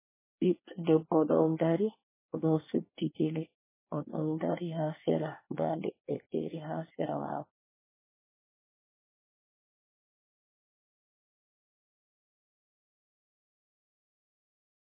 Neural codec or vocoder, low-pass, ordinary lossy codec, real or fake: codec, 24 kHz, 1 kbps, SNAC; 3.6 kHz; MP3, 16 kbps; fake